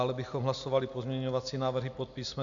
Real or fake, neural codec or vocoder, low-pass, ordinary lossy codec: real; none; 7.2 kHz; AAC, 64 kbps